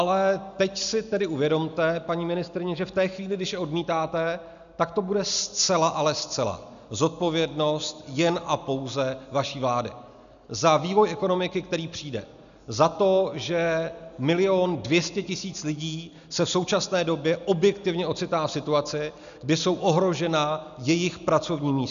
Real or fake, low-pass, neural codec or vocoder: real; 7.2 kHz; none